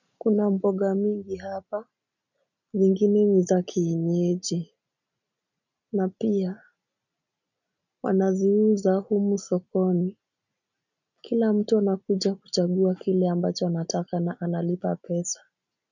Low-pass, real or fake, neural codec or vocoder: 7.2 kHz; real; none